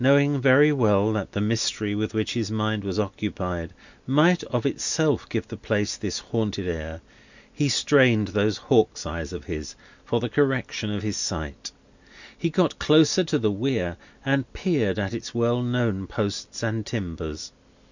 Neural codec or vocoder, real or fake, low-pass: none; real; 7.2 kHz